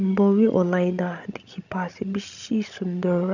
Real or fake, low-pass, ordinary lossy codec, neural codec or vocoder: fake; 7.2 kHz; none; codec, 16 kHz, 16 kbps, FreqCodec, larger model